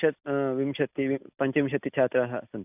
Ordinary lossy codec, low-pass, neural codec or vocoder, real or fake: AAC, 32 kbps; 3.6 kHz; none; real